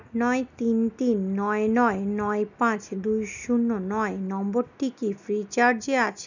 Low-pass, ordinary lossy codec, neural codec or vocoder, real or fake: 7.2 kHz; none; none; real